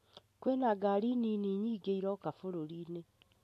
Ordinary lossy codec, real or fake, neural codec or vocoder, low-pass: AAC, 64 kbps; real; none; 14.4 kHz